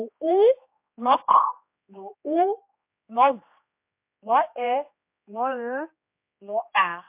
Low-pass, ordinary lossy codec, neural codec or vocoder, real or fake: 3.6 kHz; MP3, 32 kbps; codec, 16 kHz, 2 kbps, X-Codec, HuBERT features, trained on general audio; fake